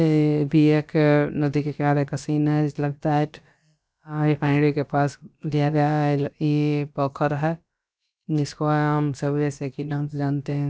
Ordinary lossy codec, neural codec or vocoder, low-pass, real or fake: none; codec, 16 kHz, about 1 kbps, DyCAST, with the encoder's durations; none; fake